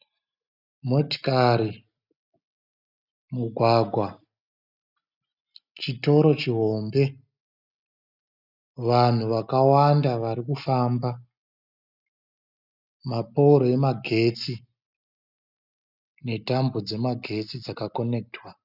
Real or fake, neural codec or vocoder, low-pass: real; none; 5.4 kHz